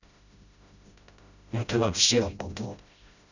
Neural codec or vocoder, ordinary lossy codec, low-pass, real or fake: codec, 16 kHz, 0.5 kbps, FreqCodec, smaller model; none; 7.2 kHz; fake